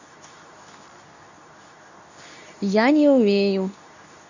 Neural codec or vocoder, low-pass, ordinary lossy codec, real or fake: codec, 24 kHz, 0.9 kbps, WavTokenizer, medium speech release version 2; 7.2 kHz; none; fake